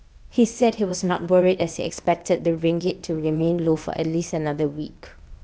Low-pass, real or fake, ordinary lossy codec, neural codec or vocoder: none; fake; none; codec, 16 kHz, 0.8 kbps, ZipCodec